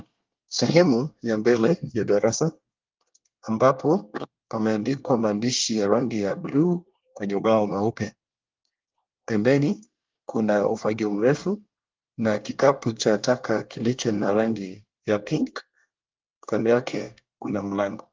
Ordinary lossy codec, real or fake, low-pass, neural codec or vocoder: Opus, 32 kbps; fake; 7.2 kHz; codec, 24 kHz, 1 kbps, SNAC